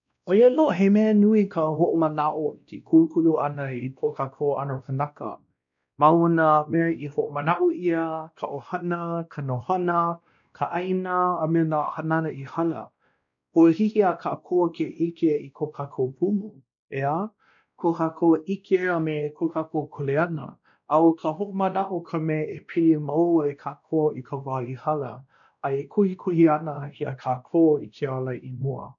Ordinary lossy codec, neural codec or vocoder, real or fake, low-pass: none; codec, 16 kHz, 1 kbps, X-Codec, WavLM features, trained on Multilingual LibriSpeech; fake; 7.2 kHz